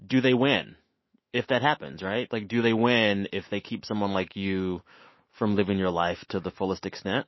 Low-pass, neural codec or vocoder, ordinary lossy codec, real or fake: 7.2 kHz; autoencoder, 48 kHz, 128 numbers a frame, DAC-VAE, trained on Japanese speech; MP3, 24 kbps; fake